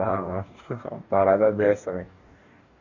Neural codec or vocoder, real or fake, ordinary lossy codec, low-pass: codec, 44.1 kHz, 2.6 kbps, DAC; fake; none; 7.2 kHz